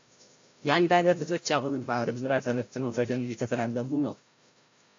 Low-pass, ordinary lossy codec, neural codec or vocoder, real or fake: 7.2 kHz; AAC, 48 kbps; codec, 16 kHz, 0.5 kbps, FreqCodec, larger model; fake